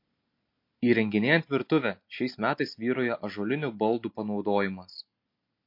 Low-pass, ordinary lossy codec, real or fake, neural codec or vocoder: 5.4 kHz; MP3, 32 kbps; real; none